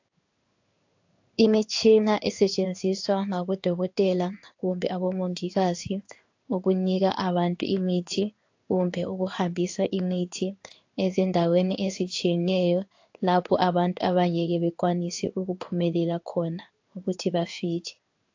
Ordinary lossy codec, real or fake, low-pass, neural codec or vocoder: AAC, 48 kbps; fake; 7.2 kHz; codec, 16 kHz in and 24 kHz out, 1 kbps, XY-Tokenizer